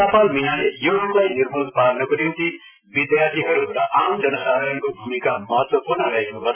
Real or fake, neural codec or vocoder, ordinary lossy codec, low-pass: real; none; none; 3.6 kHz